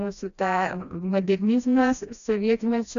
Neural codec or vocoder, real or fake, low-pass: codec, 16 kHz, 1 kbps, FreqCodec, smaller model; fake; 7.2 kHz